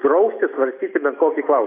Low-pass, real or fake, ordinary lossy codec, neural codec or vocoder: 3.6 kHz; real; AAC, 24 kbps; none